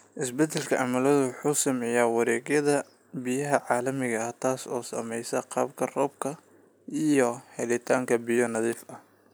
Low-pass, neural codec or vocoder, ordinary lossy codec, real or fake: none; none; none; real